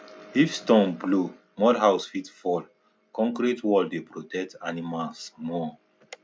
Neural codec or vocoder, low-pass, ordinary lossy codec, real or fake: none; none; none; real